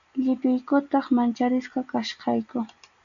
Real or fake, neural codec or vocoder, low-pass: real; none; 7.2 kHz